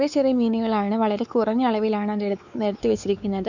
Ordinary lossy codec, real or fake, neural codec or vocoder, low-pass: none; fake; codec, 16 kHz, 4 kbps, X-Codec, WavLM features, trained on Multilingual LibriSpeech; 7.2 kHz